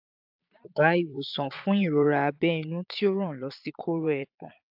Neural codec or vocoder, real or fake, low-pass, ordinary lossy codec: vocoder, 22.05 kHz, 80 mel bands, Vocos; fake; 5.4 kHz; AAC, 48 kbps